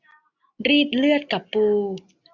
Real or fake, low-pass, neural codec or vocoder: real; 7.2 kHz; none